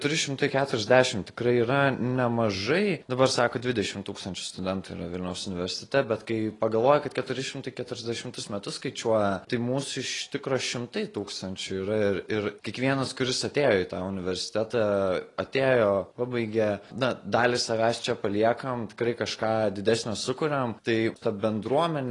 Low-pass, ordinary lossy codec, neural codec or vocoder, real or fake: 10.8 kHz; AAC, 32 kbps; none; real